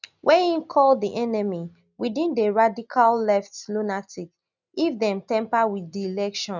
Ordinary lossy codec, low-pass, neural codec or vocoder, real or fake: none; 7.2 kHz; none; real